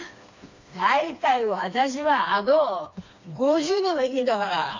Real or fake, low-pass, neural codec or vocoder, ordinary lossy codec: fake; 7.2 kHz; codec, 16 kHz, 2 kbps, FreqCodec, smaller model; AAC, 48 kbps